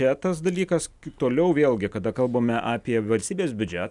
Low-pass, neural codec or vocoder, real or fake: 10.8 kHz; none; real